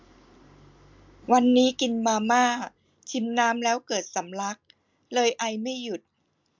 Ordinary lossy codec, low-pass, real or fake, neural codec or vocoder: MP3, 64 kbps; 7.2 kHz; real; none